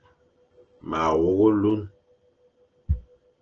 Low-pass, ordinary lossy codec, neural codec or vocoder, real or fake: 7.2 kHz; Opus, 16 kbps; none; real